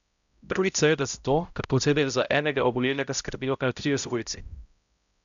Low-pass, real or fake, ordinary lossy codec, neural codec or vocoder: 7.2 kHz; fake; none; codec, 16 kHz, 0.5 kbps, X-Codec, HuBERT features, trained on balanced general audio